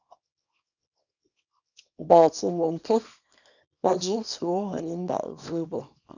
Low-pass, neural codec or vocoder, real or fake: 7.2 kHz; codec, 24 kHz, 0.9 kbps, WavTokenizer, small release; fake